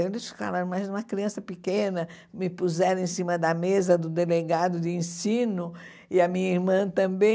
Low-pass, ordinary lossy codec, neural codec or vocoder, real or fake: none; none; none; real